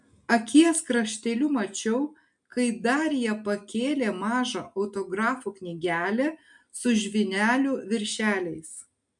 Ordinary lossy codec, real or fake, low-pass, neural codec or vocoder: MP3, 64 kbps; real; 10.8 kHz; none